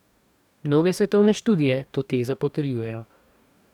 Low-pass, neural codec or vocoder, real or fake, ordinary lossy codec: 19.8 kHz; codec, 44.1 kHz, 2.6 kbps, DAC; fake; none